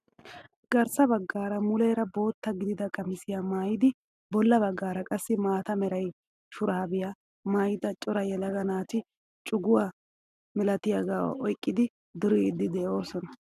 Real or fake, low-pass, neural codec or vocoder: real; 14.4 kHz; none